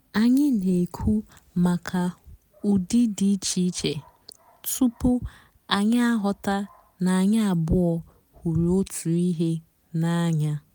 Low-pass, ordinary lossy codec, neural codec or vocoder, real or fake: none; none; none; real